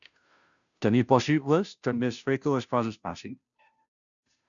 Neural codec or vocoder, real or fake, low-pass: codec, 16 kHz, 0.5 kbps, FunCodec, trained on Chinese and English, 25 frames a second; fake; 7.2 kHz